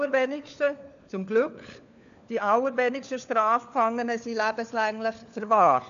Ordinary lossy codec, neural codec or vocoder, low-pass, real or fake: none; codec, 16 kHz, 4 kbps, FunCodec, trained on LibriTTS, 50 frames a second; 7.2 kHz; fake